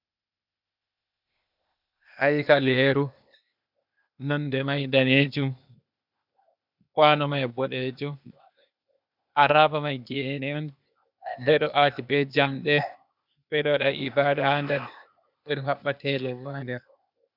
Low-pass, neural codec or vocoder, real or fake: 5.4 kHz; codec, 16 kHz, 0.8 kbps, ZipCodec; fake